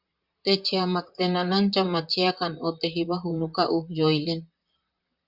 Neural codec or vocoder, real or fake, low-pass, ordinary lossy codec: vocoder, 44.1 kHz, 128 mel bands, Pupu-Vocoder; fake; 5.4 kHz; Opus, 64 kbps